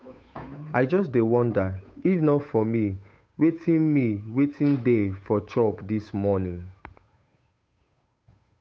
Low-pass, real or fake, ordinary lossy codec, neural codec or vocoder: none; fake; none; codec, 16 kHz, 8 kbps, FunCodec, trained on Chinese and English, 25 frames a second